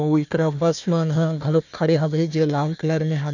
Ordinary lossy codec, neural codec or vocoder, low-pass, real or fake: none; codec, 16 kHz, 1 kbps, FunCodec, trained on Chinese and English, 50 frames a second; 7.2 kHz; fake